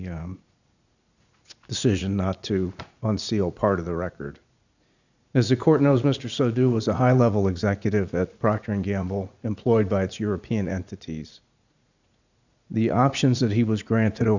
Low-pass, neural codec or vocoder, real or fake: 7.2 kHz; vocoder, 44.1 kHz, 80 mel bands, Vocos; fake